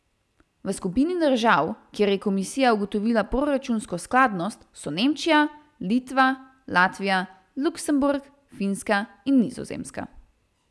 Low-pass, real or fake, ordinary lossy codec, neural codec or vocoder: none; real; none; none